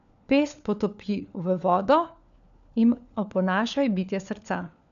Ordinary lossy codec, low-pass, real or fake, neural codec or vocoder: none; 7.2 kHz; fake; codec, 16 kHz, 4 kbps, FunCodec, trained on LibriTTS, 50 frames a second